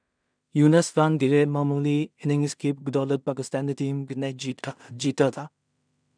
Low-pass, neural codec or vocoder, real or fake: 9.9 kHz; codec, 16 kHz in and 24 kHz out, 0.4 kbps, LongCat-Audio-Codec, two codebook decoder; fake